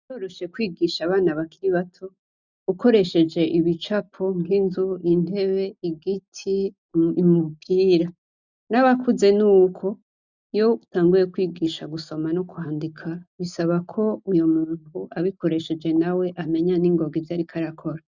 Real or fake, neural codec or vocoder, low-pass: real; none; 7.2 kHz